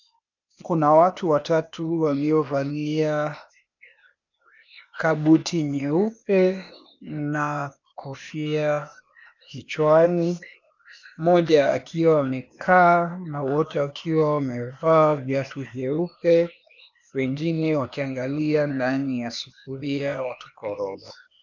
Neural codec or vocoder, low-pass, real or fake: codec, 16 kHz, 0.8 kbps, ZipCodec; 7.2 kHz; fake